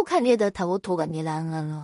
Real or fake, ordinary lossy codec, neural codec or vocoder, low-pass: fake; MP3, 48 kbps; codec, 16 kHz in and 24 kHz out, 0.4 kbps, LongCat-Audio-Codec, two codebook decoder; 10.8 kHz